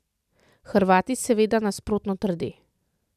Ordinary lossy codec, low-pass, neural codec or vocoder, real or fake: none; 14.4 kHz; none; real